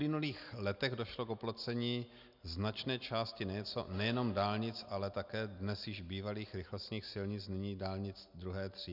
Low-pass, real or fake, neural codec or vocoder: 5.4 kHz; real; none